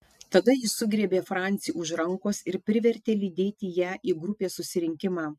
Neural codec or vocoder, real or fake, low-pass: none; real; 14.4 kHz